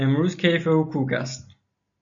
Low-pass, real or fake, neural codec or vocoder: 7.2 kHz; real; none